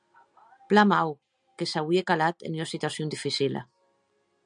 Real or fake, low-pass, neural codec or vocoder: real; 9.9 kHz; none